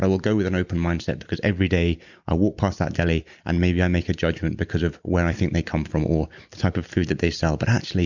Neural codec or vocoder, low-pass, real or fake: none; 7.2 kHz; real